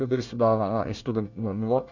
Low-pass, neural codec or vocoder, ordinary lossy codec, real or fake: 7.2 kHz; codec, 24 kHz, 1 kbps, SNAC; none; fake